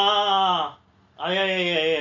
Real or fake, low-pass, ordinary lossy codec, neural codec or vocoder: real; 7.2 kHz; Opus, 64 kbps; none